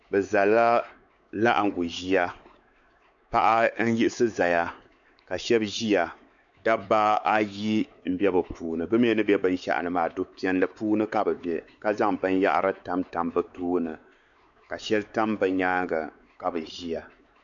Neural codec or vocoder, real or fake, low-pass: codec, 16 kHz, 4 kbps, X-Codec, WavLM features, trained on Multilingual LibriSpeech; fake; 7.2 kHz